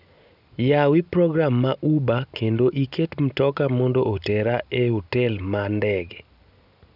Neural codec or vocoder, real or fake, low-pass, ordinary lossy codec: none; real; 5.4 kHz; none